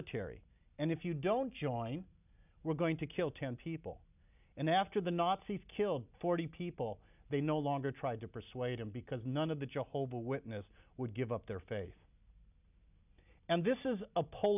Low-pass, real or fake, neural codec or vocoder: 3.6 kHz; real; none